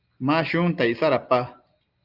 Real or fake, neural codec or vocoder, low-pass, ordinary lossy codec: real; none; 5.4 kHz; Opus, 24 kbps